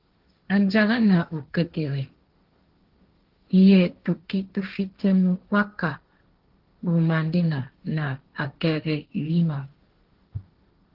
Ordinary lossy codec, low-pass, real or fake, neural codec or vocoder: Opus, 16 kbps; 5.4 kHz; fake; codec, 16 kHz, 1.1 kbps, Voila-Tokenizer